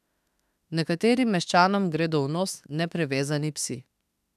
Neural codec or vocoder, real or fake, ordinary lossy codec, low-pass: autoencoder, 48 kHz, 32 numbers a frame, DAC-VAE, trained on Japanese speech; fake; none; 14.4 kHz